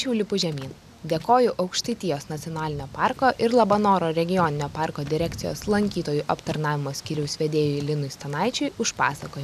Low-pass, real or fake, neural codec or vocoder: 14.4 kHz; real; none